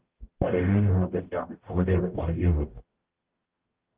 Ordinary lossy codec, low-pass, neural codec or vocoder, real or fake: Opus, 16 kbps; 3.6 kHz; codec, 44.1 kHz, 0.9 kbps, DAC; fake